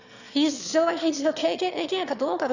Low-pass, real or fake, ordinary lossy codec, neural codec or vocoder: 7.2 kHz; fake; none; autoencoder, 22.05 kHz, a latent of 192 numbers a frame, VITS, trained on one speaker